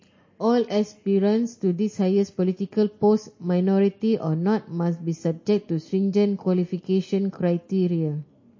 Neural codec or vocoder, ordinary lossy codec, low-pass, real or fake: none; MP3, 32 kbps; 7.2 kHz; real